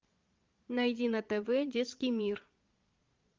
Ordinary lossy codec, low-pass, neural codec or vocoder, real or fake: Opus, 32 kbps; 7.2 kHz; vocoder, 22.05 kHz, 80 mel bands, Vocos; fake